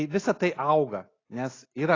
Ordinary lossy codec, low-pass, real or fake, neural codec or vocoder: AAC, 32 kbps; 7.2 kHz; real; none